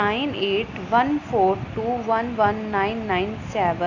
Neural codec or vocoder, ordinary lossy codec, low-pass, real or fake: none; none; 7.2 kHz; real